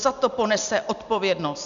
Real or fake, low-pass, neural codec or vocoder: real; 7.2 kHz; none